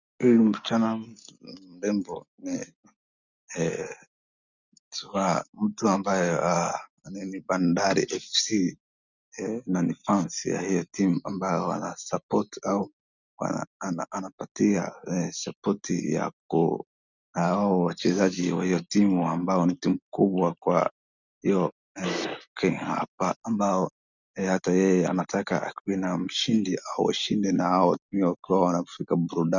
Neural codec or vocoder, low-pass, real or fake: none; 7.2 kHz; real